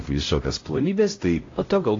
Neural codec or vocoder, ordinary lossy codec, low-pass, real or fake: codec, 16 kHz, 0.5 kbps, X-Codec, HuBERT features, trained on LibriSpeech; AAC, 32 kbps; 7.2 kHz; fake